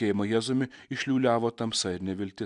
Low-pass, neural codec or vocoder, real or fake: 10.8 kHz; none; real